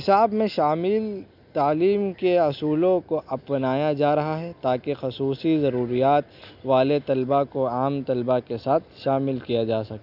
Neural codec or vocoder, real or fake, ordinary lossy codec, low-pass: none; real; none; 5.4 kHz